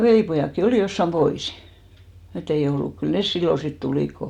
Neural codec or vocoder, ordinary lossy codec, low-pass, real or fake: none; none; 19.8 kHz; real